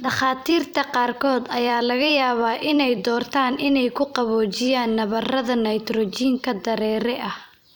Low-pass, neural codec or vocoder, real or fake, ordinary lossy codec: none; vocoder, 44.1 kHz, 128 mel bands every 256 samples, BigVGAN v2; fake; none